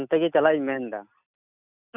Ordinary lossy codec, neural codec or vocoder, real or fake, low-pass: none; none; real; 3.6 kHz